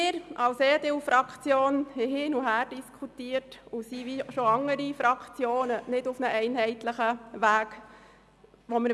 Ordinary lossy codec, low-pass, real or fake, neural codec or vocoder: none; none; real; none